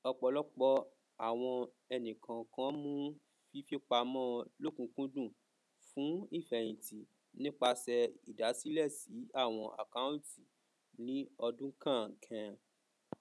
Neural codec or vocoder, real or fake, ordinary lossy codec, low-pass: none; real; none; none